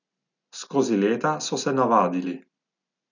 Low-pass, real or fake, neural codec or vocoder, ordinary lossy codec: 7.2 kHz; real; none; none